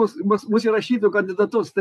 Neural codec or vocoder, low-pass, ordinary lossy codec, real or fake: vocoder, 44.1 kHz, 128 mel bands every 256 samples, BigVGAN v2; 14.4 kHz; AAC, 96 kbps; fake